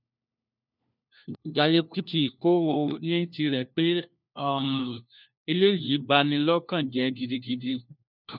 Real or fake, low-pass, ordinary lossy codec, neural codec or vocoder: fake; 5.4 kHz; none; codec, 16 kHz, 1 kbps, FunCodec, trained on LibriTTS, 50 frames a second